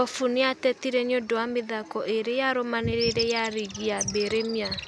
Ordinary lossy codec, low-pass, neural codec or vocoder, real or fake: none; none; none; real